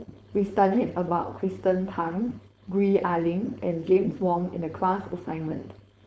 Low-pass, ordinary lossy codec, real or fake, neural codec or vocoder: none; none; fake; codec, 16 kHz, 4.8 kbps, FACodec